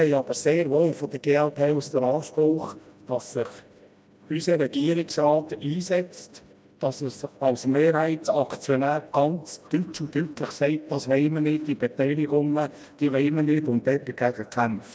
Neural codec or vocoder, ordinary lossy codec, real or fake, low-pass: codec, 16 kHz, 1 kbps, FreqCodec, smaller model; none; fake; none